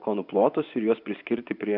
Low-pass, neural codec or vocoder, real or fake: 5.4 kHz; vocoder, 24 kHz, 100 mel bands, Vocos; fake